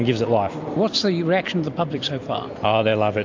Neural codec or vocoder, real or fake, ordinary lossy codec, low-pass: none; real; AAC, 48 kbps; 7.2 kHz